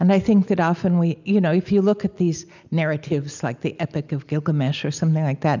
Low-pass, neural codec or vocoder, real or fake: 7.2 kHz; none; real